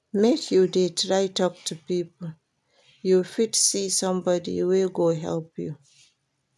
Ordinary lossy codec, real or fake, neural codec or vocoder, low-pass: none; real; none; none